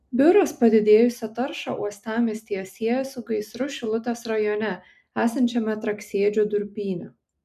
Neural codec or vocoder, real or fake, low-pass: none; real; 14.4 kHz